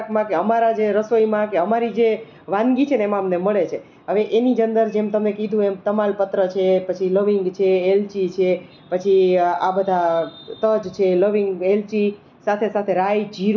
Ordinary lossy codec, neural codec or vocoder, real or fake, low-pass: none; none; real; 7.2 kHz